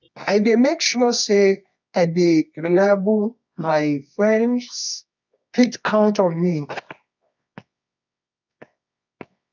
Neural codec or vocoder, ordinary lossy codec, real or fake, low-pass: codec, 24 kHz, 0.9 kbps, WavTokenizer, medium music audio release; none; fake; 7.2 kHz